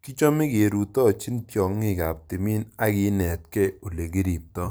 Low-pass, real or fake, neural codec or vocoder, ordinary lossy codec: none; fake; vocoder, 44.1 kHz, 128 mel bands every 256 samples, BigVGAN v2; none